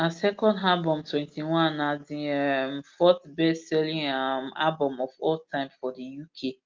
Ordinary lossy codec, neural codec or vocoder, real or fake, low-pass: Opus, 24 kbps; none; real; 7.2 kHz